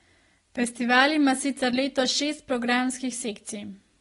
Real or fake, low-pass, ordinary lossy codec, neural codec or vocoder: real; 10.8 kHz; AAC, 32 kbps; none